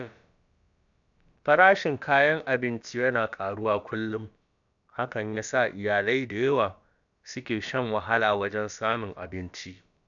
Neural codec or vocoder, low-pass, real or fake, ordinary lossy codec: codec, 16 kHz, about 1 kbps, DyCAST, with the encoder's durations; 7.2 kHz; fake; none